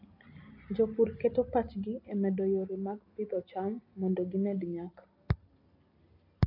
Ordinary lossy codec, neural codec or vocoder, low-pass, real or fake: AAC, 48 kbps; none; 5.4 kHz; real